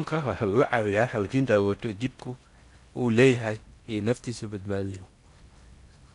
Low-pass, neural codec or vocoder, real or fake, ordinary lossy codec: 10.8 kHz; codec, 16 kHz in and 24 kHz out, 0.6 kbps, FocalCodec, streaming, 4096 codes; fake; none